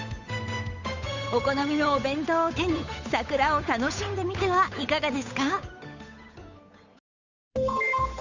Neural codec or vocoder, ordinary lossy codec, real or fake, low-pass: codec, 16 kHz, 8 kbps, FunCodec, trained on Chinese and English, 25 frames a second; Opus, 64 kbps; fake; 7.2 kHz